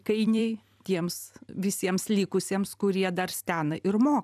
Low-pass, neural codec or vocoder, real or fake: 14.4 kHz; vocoder, 44.1 kHz, 128 mel bands every 256 samples, BigVGAN v2; fake